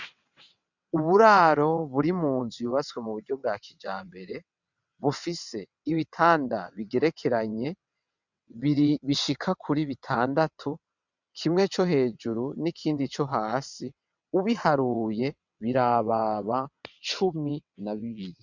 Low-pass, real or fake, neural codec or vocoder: 7.2 kHz; fake; vocoder, 22.05 kHz, 80 mel bands, WaveNeXt